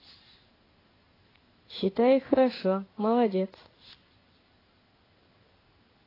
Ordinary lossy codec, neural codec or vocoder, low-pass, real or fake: AAC, 24 kbps; codec, 16 kHz in and 24 kHz out, 1 kbps, XY-Tokenizer; 5.4 kHz; fake